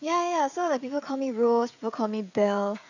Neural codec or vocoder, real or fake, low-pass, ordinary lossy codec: codec, 16 kHz, 16 kbps, FreqCodec, smaller model; fake; 7.2 kHz; none